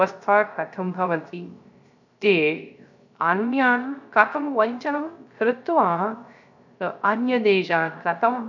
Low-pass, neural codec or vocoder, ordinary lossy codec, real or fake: 7.2 kHz; codec, 16 kHz, 0.3 kbps, FocalCodec; none; fake